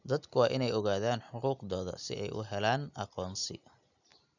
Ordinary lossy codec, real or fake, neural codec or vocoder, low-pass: none; real; none; 7.2 kHz